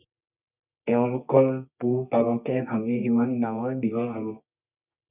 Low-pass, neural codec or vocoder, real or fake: 3.6 kHz; codec, 24 kHz, 0.9 kbps, WavTokenizer, medium music audio release; fake